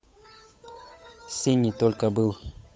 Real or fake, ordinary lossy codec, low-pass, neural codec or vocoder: fake; none; none; codec, 16 kHz, 8 kbps, FunCodec, trained on Chinese and English, 25 frames a second